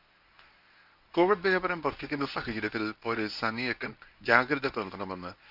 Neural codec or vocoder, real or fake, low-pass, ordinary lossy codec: codec, 24 kHz, 0.9 kbps, WavTokenizer, medium speech release version 1; fake; 5.4 kHz; none